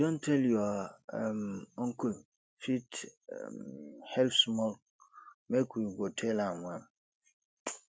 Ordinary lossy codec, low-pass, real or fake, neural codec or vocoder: none; none; real; none